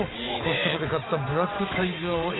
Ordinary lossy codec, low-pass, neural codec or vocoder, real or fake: AAC, 16 kbps; 7.2 kHz; vocoder, 22.05 kHz, 80 mel bands, WaveNeXt; fake